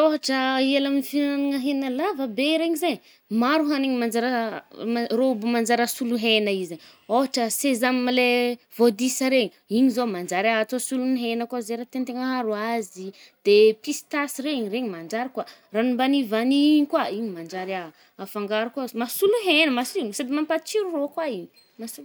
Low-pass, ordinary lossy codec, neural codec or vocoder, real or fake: none; none; none; real